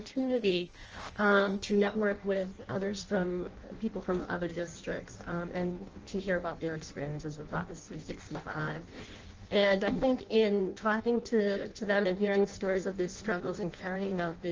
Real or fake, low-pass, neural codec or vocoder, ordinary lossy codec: fake; 7.2 kHz; codec, 16 kHz in and 24 kHz out, 0.6 kbps, FireRedTTS-2 codec; Opus, 24 kbps